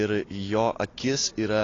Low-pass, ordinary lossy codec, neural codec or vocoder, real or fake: 7.2 kHz; AAC, 32 kbps; none; real